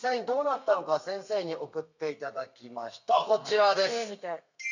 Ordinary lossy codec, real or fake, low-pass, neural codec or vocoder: none; fake; 7.2 kHz; codec, 32 kHz, 1.9 kbps, SNAC